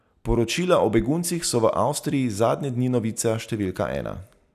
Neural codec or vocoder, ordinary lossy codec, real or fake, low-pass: none; none; real; 14.4 kHz